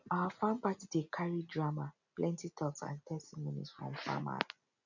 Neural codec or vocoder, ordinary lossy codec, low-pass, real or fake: none; none; 7.2 kHz; real